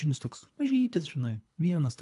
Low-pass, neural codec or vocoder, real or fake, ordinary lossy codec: 10.8 kHz; codec, 24 kHz, 3 kbps, HILCodec; fake; AAC, 64 kbps